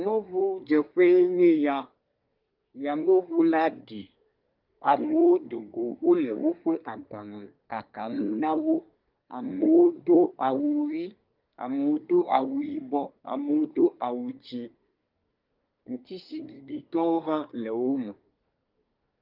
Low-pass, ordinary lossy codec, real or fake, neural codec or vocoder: 5.4 kHz; Opus, 24 kbps; fake; codec, 24 kHz, 1 kbps, SNAC